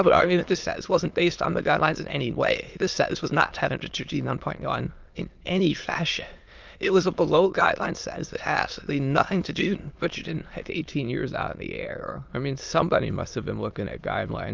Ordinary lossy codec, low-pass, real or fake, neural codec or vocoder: Opus, 24 kbps; 7.2 kHz; fake; autoencoder, 22.05 kHz, a latent of 192 numbers a frame, VITS, trained on many speakers